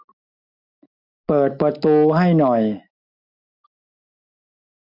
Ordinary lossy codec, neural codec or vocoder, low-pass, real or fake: none; none; 5.4 kHz; real